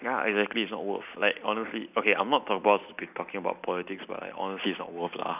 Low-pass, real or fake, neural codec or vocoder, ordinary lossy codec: 3.6 kHz; real; none; none